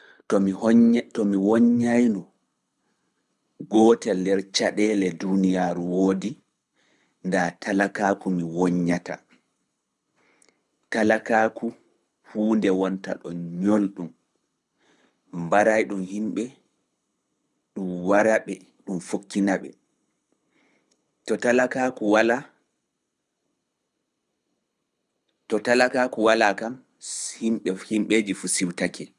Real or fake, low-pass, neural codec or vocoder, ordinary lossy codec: fake; none; codec, 24 kHz, 6 kbps, HILCodec; none